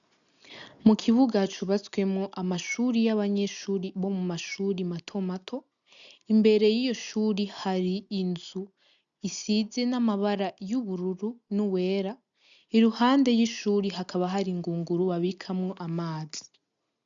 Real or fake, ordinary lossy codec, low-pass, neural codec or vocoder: real; MP3, 96 kbps; 7.2 kHz; none